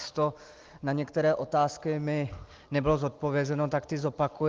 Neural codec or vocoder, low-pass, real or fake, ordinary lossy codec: none; 7.2 kHz; real; Opus, 32 kbps